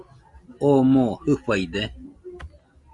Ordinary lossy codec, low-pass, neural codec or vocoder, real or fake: Opus, 64 kbps; 10.8 kHz; none; real